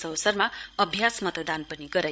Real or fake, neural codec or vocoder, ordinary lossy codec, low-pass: fake; codec, 16 kHz, 16 kbps, FreqCodec, larger model; none; none